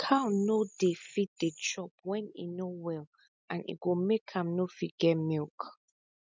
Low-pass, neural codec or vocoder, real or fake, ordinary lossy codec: none; none; real; none